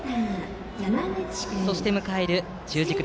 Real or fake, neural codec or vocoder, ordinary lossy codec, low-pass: real; none; none; none